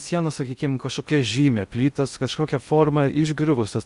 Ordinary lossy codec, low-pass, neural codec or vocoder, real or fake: Opus, 64 kbps; 10.8 kHz; codec, 16 kHz in and 24 kHz out, 0.6 kbps, FocalCodec, streaming, 2048 codes; fake